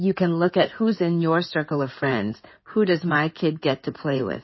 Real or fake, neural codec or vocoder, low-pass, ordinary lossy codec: fake; codec, 16 kHz in and 24 kHz out, 2.2 kbps, FireRedTTS-2 codec; 7.2 kHz; MP3, 24 kbps